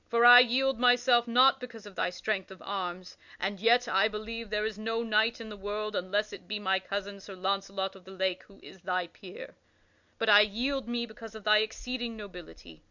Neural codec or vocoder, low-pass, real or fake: none; 7.2 kHz; real